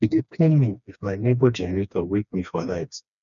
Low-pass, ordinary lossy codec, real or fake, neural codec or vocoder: 7.2 kHz; none; fake; codec, 16 kHz, 2 kbps, FreqCodec, smaller model